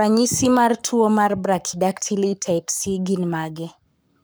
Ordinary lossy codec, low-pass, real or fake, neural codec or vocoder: none; none; fake; codec, 44.1 kHz, 7.8 kbps, Pupu-Codec